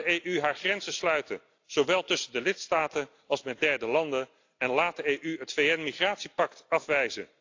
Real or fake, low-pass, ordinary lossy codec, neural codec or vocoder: real; 7.2 kHz; AAC, 48 kbps; none